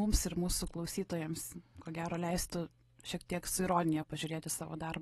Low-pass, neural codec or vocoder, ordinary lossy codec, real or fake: 14.4 kHz; none; AAC, 32 kbps; real